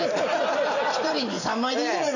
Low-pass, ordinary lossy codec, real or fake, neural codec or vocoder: 7.2 kHz; none; real; none